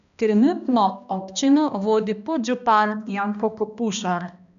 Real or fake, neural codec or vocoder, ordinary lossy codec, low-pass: fake; codec, 16 kHz, 1 kbps, X-Codec, HuBERT features, trained on balanced general audio; none; 7.2 kHz